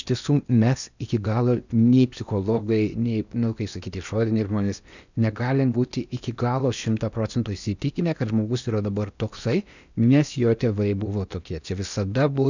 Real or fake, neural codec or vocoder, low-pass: fake; codec, 16 kHz in and 24 kHz out, 0.8 kbps, FocalCodec, streaming, 65536 codes; 7.2 kHz